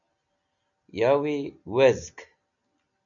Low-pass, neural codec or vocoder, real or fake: 7.2 kHz; none; real